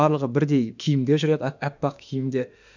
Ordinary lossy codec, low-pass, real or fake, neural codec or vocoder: none; 7.2 kHz; fake; autoencoder, 48 kHz, 32 numbers a frame, DAC-VAE, trained on Japanese speech